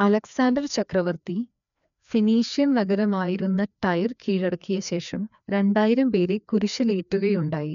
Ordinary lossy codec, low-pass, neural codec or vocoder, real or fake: none; 7.2 kHz; codec, 16 kHz, 2 kbps, FreqCodec, larger model; fake